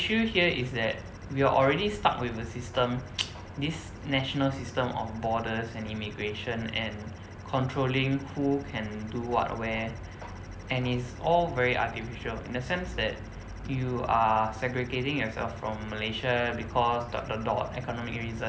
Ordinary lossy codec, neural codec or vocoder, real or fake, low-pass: none; none; real; none